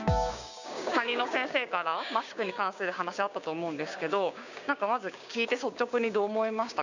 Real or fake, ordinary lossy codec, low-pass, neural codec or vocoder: fake; none; 7.2 kHz; codec, 16 kHz, 6 kbps, DAC